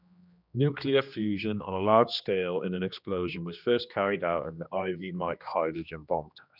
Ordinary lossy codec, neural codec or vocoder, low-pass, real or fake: none; codec, 16 kHz, 2 kbps, X-Codec, HuBERT features, trained on general audio; 5.4 kHz; fake